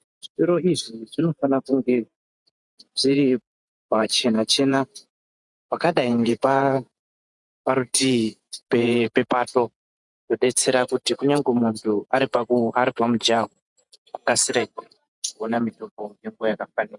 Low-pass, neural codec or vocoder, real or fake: 10.8 kHz; vocoder, 48 kHz, 128 mel bands, Vocos; fake